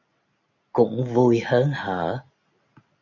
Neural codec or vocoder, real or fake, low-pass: none; real; 7.2 kHz